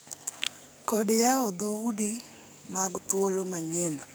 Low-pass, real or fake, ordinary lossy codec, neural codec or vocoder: none; fake; none; codec, 44.1 kHz, 2.6 kbps, SNAC